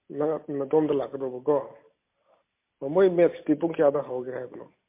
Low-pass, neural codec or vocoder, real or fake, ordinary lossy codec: 3.6 kHz; none; real; MP3, 24 kbps